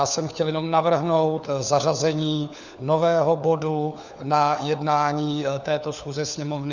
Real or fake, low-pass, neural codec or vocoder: fake; 7.2 kHz; codec, 16 kHz, 4 kbps, FunCodec, trained on LibriTTS, 50 frames a second